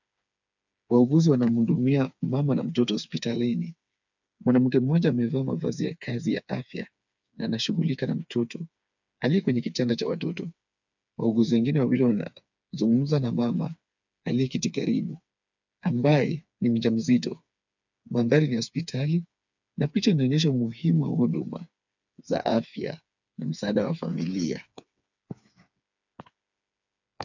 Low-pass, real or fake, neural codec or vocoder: 7.2 kHz; fake; codec, 16 kHz, 4 kbps, FreqCodec, smaller model